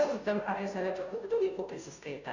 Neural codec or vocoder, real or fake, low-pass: codec, 16 kHz, 0.5 kbps, FunCodec, trained on Chinese and English, 25 frames a second; fake; 7.2 kHz